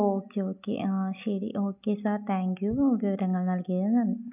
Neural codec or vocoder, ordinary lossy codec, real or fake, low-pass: none; none; real; 3.6 kHz